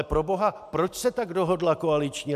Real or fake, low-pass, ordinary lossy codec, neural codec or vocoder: real; 14.4 kHz; AAC, 96 kbps; none